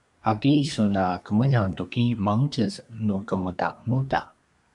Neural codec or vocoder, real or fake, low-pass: codec, 24 kHz, 1 kbps, SNAC; fake; 10.8 kHz